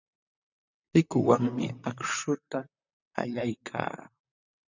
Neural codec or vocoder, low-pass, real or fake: vocoder, 44.1 kHz, 128 mel bands, Pupu-Vocoder; 7.2 kHz; fake